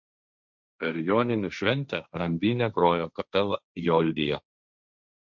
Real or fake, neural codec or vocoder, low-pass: fake; codec, 16 kHz, 1.1 kbps, Voila-Tokenizer; 7.2 kHz